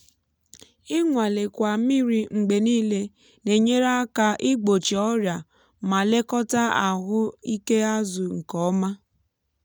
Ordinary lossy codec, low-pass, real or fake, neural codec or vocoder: none; none; real; none